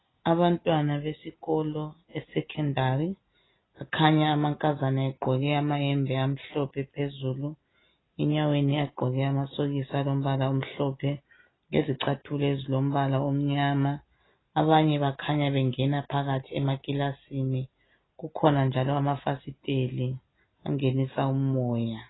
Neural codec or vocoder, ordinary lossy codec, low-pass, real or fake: none; AAC, 16 kbps; 7.2 kHz; real